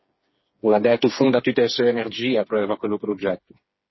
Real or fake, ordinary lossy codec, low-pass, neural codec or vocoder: fake; MP3, 24 kbps; 7.2 kHz; codec, 16 kHz, 4 kbps, FreqCodec, smaller model